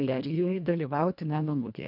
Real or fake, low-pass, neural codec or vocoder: fake; 5.4 kHz; codec, 24 kHz, 1.5 kbps, HILCodec